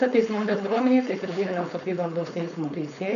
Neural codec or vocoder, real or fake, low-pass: codec, 16 kHz, 4.8 kbps, FACodec; fake; 7.2 kHz